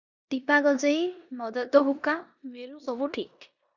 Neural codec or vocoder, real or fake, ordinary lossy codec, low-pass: codec, 16 kHz in and 24 kHz out, 0.9 kbps, LongCat-Audio-Codec, four codebook decoder; fake; Opus, 64 kbps; 7.2 kHz